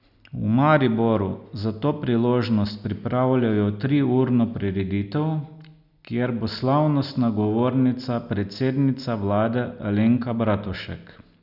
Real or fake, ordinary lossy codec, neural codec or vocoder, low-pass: real; none; none; 5.4 kHz